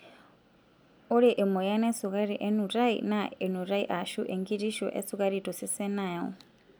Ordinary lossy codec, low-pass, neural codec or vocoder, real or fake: none; none; none; real